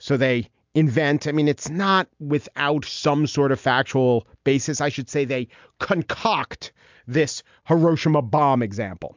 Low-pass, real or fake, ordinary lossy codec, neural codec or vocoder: 7.2 kHz; real; MP3, 64 kbps; none